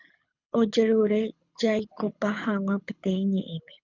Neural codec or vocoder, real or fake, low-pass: codec, 24 kHz, 6 kbps, HILCodec; fake; 7.2 kHz